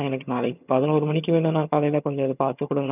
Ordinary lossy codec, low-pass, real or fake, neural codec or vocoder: none; 3.6 kHz; fake; vocoder, 22.05 kHz, 80 mel bands, HiFi-GAN